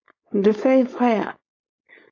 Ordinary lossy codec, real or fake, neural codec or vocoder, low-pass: AAC, 48 kbps; fake; codec, 16 kHz, 4.8 kbps, FACodec; 7.2 kHz